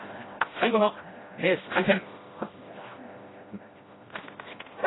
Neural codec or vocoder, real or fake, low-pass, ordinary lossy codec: codec, 16 kHz, 1 kbps, FreqCodec, smaller model; fake; 7.2 kHz; AAC, 16 kbps